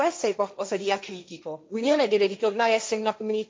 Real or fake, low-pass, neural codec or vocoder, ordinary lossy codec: fake; none; codec, 16 kHz, 1.1 kbps, Voila-Tokenizer; none